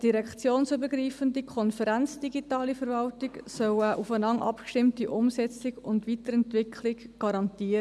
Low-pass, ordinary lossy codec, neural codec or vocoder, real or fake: none; none; none; real